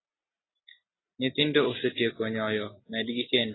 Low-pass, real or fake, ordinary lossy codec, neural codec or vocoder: 7.2 kHz; real; AAC, 16 kbps; none